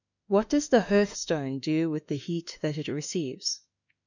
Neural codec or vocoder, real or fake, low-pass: autoencoder, 48 kHz, 32 numbers a frame, DAC-VAE, trained on Japanese speech; fake; 7.2 kHz